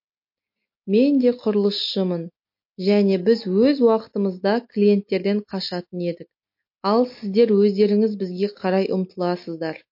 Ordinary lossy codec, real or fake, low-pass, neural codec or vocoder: MP3, 32 kbps; real; 5.4 kHz; none